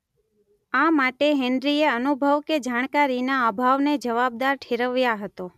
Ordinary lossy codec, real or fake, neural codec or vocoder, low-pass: none; real; none; 14.4 kHz